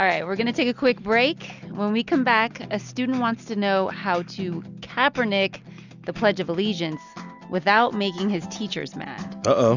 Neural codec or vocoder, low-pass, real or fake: none; 7.2 kHz; real